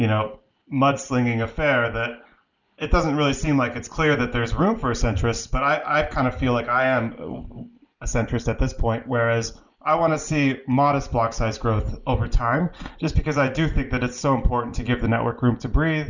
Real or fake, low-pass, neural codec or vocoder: real; 7.2 kHz; none